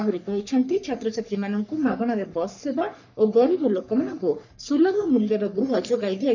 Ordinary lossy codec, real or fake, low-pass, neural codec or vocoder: none; fake; 7.2 kHz; codec, 44.1 kHz, 3.4 kbps, Pupu-Codec